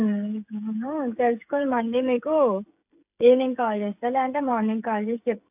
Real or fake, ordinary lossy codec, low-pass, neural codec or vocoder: fake; AAC, 32 kbps; 3.6 kHz; codec, 16 kHz, 16 kbps, FreqCodec, smaller model